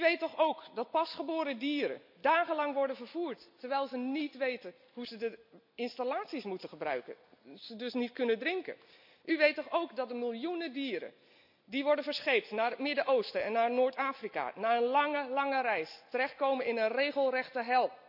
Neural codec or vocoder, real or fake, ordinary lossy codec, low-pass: none; real; AAC, 48 kbps; 5.4 kHz